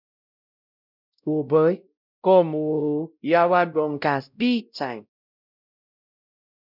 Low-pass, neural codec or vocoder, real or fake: 5.4 kHz; codec, 16 kHz, 0.5 kbps, X-Codec, WavLM features, trained on Multilingual LibriSpeech; fake